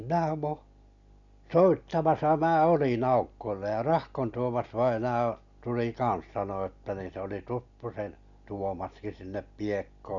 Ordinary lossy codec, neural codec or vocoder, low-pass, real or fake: none; none; 7.2 kHz; real